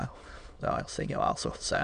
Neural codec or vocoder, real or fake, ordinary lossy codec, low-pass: autoencoder, 22.05 kHz, a latent of 192 numbers a frame, VITS, trained on many speakers; fake; AAC, 96 kbps; 9.9 kHz